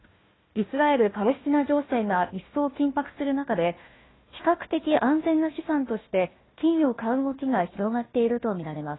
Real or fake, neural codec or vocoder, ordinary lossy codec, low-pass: fake; codec, 16 kHz, 1 kbps, FunCodec, trained on Chinese and English, 50 frames a second; AAC, 16 kbps; 7.2 kHz